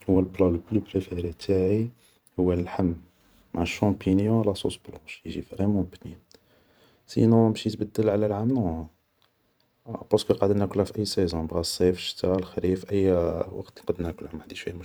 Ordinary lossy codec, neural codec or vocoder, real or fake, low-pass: none; vocoder, 44.1 kHz, 128 mel bands every 512 samples, BigVGAN v2; fake; none